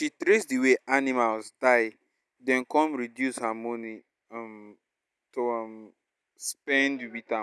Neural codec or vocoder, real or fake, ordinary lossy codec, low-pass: none; real; none; none